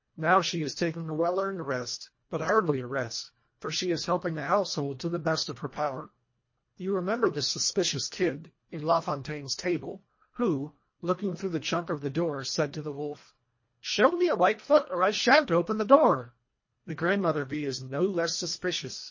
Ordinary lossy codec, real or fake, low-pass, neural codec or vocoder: MP3, 32 kbps; fake; 7.2 kHz; codec, 24 kHz, 1.5 kbps, HILCodec